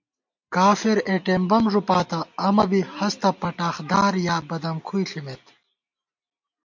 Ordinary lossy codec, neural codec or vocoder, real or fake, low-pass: MP3, 48 kbps; none; real; 7.2 kHz